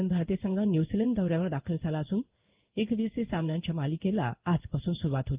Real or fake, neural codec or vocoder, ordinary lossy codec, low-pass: fake; codec, 16 kHz in and 24 kHz out, 1 kbps, XY-Tokenizer; Opus, 32 kbps; 3.6 kHz